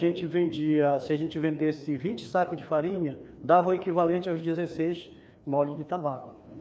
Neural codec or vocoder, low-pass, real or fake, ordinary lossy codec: codec, 16 kHz, 2 kbps, FreqCodec, larger model; none; fake; none